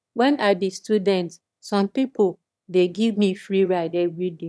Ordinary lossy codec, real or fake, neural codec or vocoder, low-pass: none; fake; autoencoder, 22.05 kHz, a latent of 192 numbers a frame, VITS, trained on one speaker; none